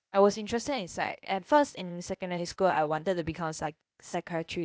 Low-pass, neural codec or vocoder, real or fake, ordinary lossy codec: none; codec, 16 kHz, 0.8 kbps, ZipCodec; fake; none